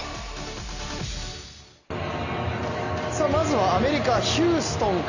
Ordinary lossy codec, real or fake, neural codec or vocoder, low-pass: none; real; none; 7.2 kHz